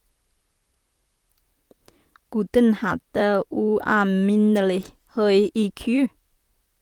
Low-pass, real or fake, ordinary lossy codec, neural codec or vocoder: 19.8 kHz; fake; Opus, 32 kbps; vocoder, 44.1 kHz, 128 mel bands, Pupu-Vocoder